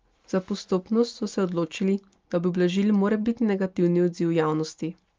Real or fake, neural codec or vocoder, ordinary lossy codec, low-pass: real; none; Opus, 32 kbps; 7.2 kHz